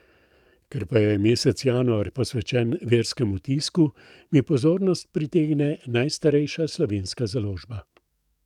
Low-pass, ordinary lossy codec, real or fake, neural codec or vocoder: 19.8 kHz; none; fake; codec, 44.1 kHz, 7.8 kbps, DAC